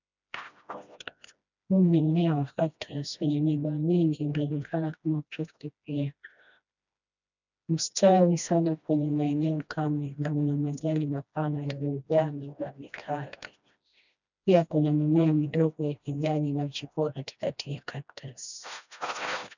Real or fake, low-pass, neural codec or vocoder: fake; 7.2 kHz; codec, 16 kHz, 1 kbps, FreqCodec, smaller model